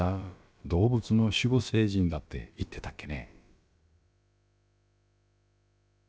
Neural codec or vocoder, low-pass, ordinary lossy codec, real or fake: codec, 16 kHz, about 1 kbps, DyCAST, with the encoder's durations; none; none; fake